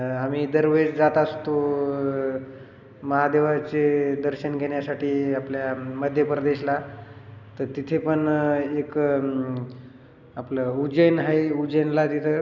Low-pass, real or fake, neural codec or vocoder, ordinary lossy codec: 7.2 kHz; real; none; none